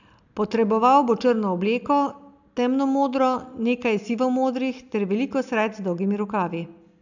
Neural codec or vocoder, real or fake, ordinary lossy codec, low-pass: none; real; none; 7.2 kHz